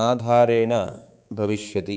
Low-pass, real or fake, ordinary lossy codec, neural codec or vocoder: none; fake; none; codec, 16 kHz, 4 kbps, X-Codec, HuBERT features, trained on balanced general audio